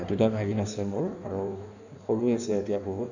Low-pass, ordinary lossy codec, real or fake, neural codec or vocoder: 7.2 kHz; none; fake; codec, 16 kHz in and 24 kHz out, 1.1 kbps, FireRedTTS-2 codec